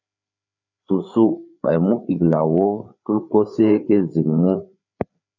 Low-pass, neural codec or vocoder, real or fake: 7.2 kHz; codec, 16 kHz, 4 kbps, FreqCodec, larger model; fake